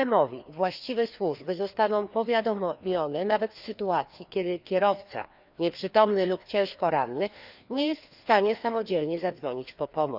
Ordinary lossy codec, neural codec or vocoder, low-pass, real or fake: none; codec, 16 kHz, 2 kbps, FreqCodec, larger model; 5.4 kHz; fake